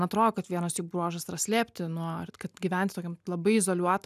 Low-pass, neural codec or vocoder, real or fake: 14.4 kHz; none; real